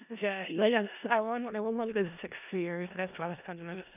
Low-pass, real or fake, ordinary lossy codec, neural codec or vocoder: 3.6 kHz; fake; none; codec, 16 kHz in and 24 kHz out, 0.4 kbps, LongCat-Audio-Codec, four codebook decoder